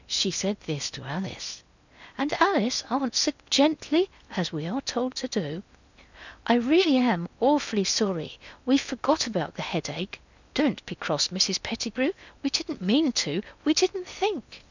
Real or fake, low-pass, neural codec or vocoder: fake; 7.2 kHz; codec, 16 kHz in and 24 kHz out, 0.8 kbps, FocalCodec, streaming, 65536 codes